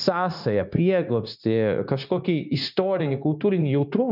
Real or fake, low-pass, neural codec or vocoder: fake; 5.4 kHz; codec, 16 kHz, 0.9 kbps, LongCat-Audio-Codec